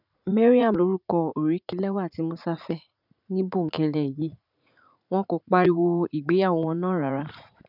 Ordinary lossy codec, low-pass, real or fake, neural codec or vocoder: none; 5.4 kHz; fake; vocoder, 44.1 kHz, 80 mel bands, Vocos